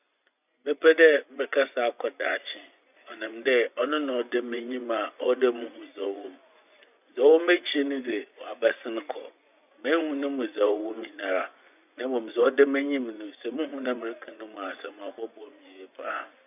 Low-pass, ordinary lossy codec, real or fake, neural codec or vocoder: 3.6 kHz; none; fake; vocoder, 24 kHz, 100 mel bands, Vocos